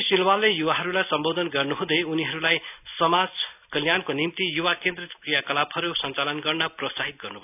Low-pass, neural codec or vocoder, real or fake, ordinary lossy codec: 3.6 kHz; none; real; none